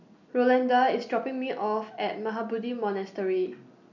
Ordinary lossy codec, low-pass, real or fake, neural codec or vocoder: none; 7.2 kHz; real; none